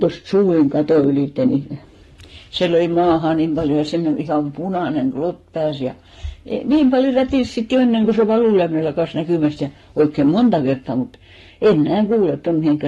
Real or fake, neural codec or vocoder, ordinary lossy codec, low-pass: fake; codec, 44.1 kHz, 7.8 kbps, Pupu-Codec; AAC, 32 kbps; 19.8 kHz